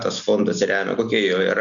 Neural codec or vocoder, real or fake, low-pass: none; real; 7.2 kHz